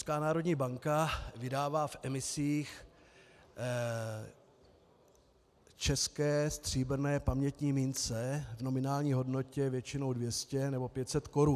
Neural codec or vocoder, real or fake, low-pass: none; real; 14.4 kHz